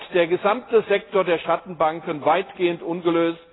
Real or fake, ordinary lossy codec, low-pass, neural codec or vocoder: real; AAC, 16 kbps; 7.2 kHz; none